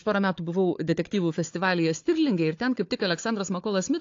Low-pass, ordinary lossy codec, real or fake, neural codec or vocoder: 7.2 kHz; AAC, 48 kbps; fake; codec, 16 kHz, 4 kbps, FunCodec, trained on Chinese and English, 50 frames a second